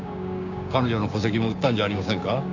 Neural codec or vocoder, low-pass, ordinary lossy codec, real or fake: codec, 16 kHz, 6 kbps, DAC; 7.2 kHz; none; fake